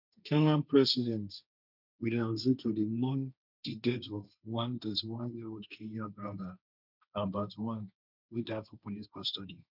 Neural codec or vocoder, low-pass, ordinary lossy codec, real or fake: codec, 16 kHz, 1.1 kbps, Voila-Tokenizer; 5.4 kHz; none; fake